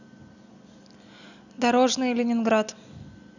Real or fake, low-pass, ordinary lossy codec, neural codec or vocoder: real; 7.2 kHz; none; none